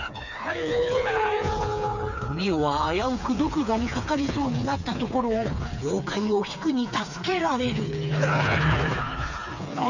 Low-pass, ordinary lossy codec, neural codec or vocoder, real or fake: 7.2 kHz; none; codec, 16 kHz, 4 kbps, FreqCodec, smaller model; fake